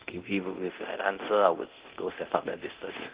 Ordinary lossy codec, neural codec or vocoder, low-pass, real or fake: Opus, 16 kbps; codec, 24 kHz, 0.9 kbps, DualCodec; 3.6 kHz; fake